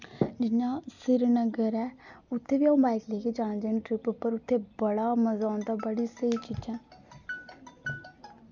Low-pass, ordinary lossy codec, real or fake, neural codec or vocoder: 7.2 kHz; none; real; none